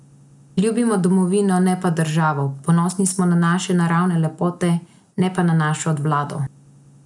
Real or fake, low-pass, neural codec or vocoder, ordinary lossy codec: real; 10.8 kHz; none; none